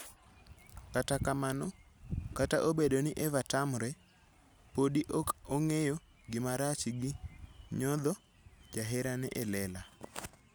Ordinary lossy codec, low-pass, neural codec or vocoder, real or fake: none; none; none; real